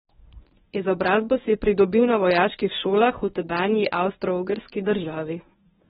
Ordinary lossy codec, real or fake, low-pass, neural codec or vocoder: AAC, 16 kbps; fake; 10.8 kHz; codec, 24 kHz, 0.9 kbps, WavTokenizer, medium speech release version 1